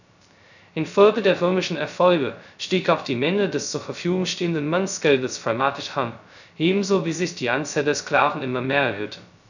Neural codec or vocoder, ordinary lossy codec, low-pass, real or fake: codec, 16 kHz, 0.2 kbps, FocalCodec; none; 7.2 kHz; fake